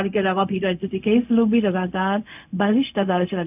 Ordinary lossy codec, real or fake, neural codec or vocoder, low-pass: none; fake; codec, 16 kHz, 0.4 kbps, LongCat-Audio-Codec; 3.6 kHz